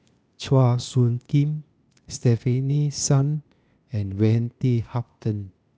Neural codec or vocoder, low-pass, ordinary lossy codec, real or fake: codec, 16 kHz, 0.8 kbps, ZipCodec; none; none; fake